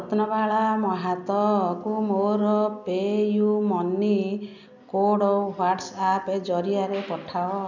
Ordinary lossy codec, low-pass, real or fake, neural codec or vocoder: none; 7.2 kHz; real; none